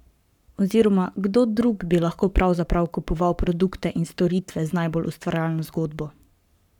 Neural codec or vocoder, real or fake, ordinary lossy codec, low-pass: codec, 44.1 kHz, 7.8 kbps, Pupu-Codec; fake; none; 19.8 kHz